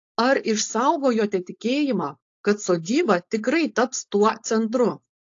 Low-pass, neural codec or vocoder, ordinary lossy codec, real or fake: 7.2 kHz; codec, 16 kHz, 4.8 kbps, FACodec; AAC, 48 kbps; fake